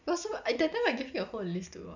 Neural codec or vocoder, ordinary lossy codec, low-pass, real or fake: none; none; 7.2 kHz; real